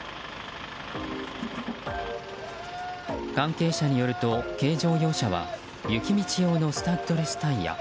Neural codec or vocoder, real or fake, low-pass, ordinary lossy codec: none; real; none; none